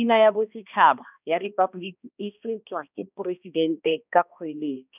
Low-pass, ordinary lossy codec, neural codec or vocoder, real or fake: 3.6 kHz; none; codec, 16 kHz, 1 kbps, X-Codec, HuBERT features, trained on balanced general audio; fake